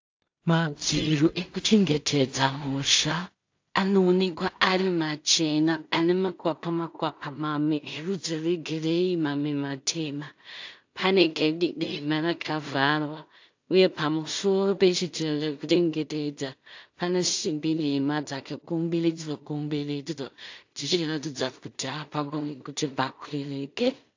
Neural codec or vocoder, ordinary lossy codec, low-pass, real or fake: codec, 16 kHz in and 24 kHz out, 0.4 kbps, LongCat-Audio-Codec, two codebook decoder; AAC, 48 kbps; 7.2 kHz; fake